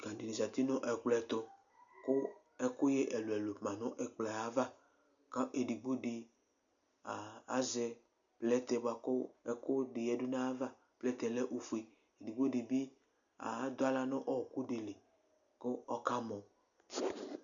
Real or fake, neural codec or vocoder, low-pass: real; none; 7.2 kHz